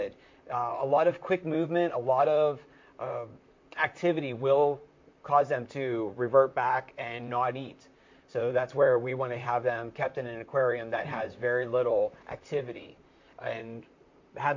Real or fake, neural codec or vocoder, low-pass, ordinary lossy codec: fake; vocoder, 44.1 kHz, 128 mel bands, Pupu-Vocoder; 7.2 kHz; MP3, 48 kbps